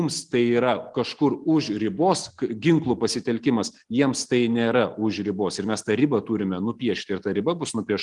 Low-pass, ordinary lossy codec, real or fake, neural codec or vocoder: 10.8 kHz; Opus, 24 kbps; real; none